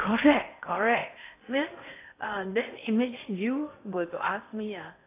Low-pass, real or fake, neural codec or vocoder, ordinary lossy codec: 3.6 kHz; fake; codec, 16 kHz in and 24 kHz out, 0.6 kbps, FocalCodec, streaming, 4096 codes; AAC, 24 kbps